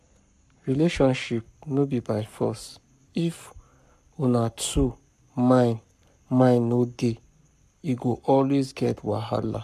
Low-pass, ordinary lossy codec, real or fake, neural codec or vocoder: 14.4 kHz; MP3, 96 kbps; fake; codec, 44.1 kHz, 7.8 kbps, Pupu-Codec